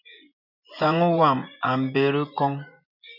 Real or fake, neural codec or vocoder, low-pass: fake; vocoder, 24 kHz, 100 mel bands, Vocos; 5.4 kHz